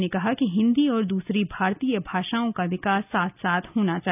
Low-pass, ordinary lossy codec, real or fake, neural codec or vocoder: 3.6 kHz; none; real; none